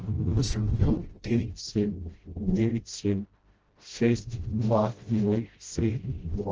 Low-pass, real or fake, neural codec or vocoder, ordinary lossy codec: 7.2 kHz; fake; codec, 16 kHz, 0.5 kbps, FreqCodec, smaller model; Opus, 16 kbps